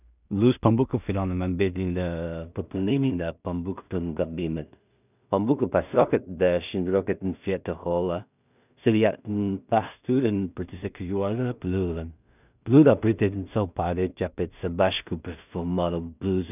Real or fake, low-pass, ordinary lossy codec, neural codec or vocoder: fake; 3.6 kHz; none; codec, 16 kHz in and 24 kHz out, 0.4 kbps, LongCat-Audio-Codec, two codebook decoder